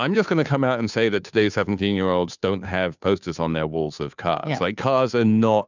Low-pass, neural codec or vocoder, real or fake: 7.2 kHz; codec, 16 kHz, 2 kbps, FunCodec, trained on Chinese and English, 25 frames a second; fake